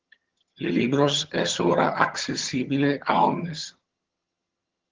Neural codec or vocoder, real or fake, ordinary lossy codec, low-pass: vocoder, 22.05 kHz, 80 mel bands, HiFi-GAN; fake; Opus, 16 kbps; 7.2 kHz